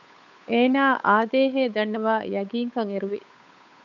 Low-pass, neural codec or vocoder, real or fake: 7.2 kHz; codec, 16 kHz, 8 kbps, FunCodec, trained on Chinese and English, 25 frames a second; fake